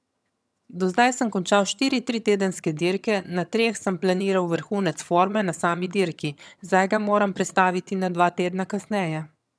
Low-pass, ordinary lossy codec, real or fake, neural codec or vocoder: none; none; fake; vocoder, 22.05 kHz, 80 mel bands, HiFi-GAN